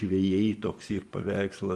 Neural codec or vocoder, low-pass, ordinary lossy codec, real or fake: vocoder, 24 kHz, 100 mel bands, Vocos; 10.8 kHz; Opus, 32 kbps; fake